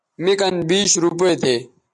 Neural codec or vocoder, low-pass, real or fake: none; 10.8 kHz; real